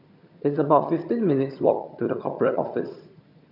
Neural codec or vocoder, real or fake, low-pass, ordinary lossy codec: vocoder, 22.05 kHz, 80 mel bands, HiFi-GAN; fake; 5.4 kHz; none